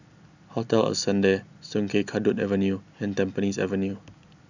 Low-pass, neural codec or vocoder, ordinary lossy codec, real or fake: 7.2 kHz; none; none; real